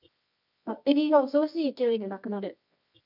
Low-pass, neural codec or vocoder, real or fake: 5.4 kHz; codec, 24 kHz, 0.9 kbps, WavTokenizer, medium music audio release; fake